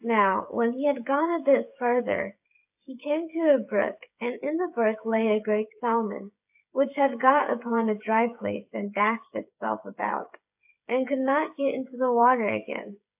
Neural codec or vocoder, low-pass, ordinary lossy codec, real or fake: codec, 16 kHz, 8 kbps, FreqCodec, smaller model; 3.6 kHz; AAC, 32 kbps; fake